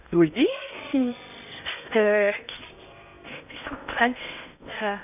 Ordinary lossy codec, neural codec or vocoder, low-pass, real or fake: none; codec, 16 kHz in and 24 kHz out, 0.8 kbps, FocalCodec, streaming, 65536 codes; 3.6 kHz; fake